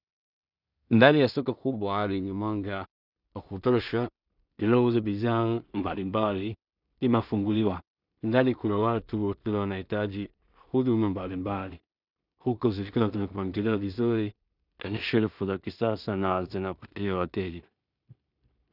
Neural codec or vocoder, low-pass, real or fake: codec, 16 kHz in and 24 kHz out, 0.4 kbps, LongCat-Audio-Codec, two codebook decoder; 5.4 kHz; fake